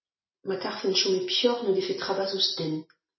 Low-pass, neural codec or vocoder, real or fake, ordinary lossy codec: 7.2 kHz; none; real; MP3, 24 kbps